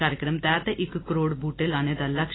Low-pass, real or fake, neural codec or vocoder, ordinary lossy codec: 7.2 kHz; real; none; AAC, 16 kbps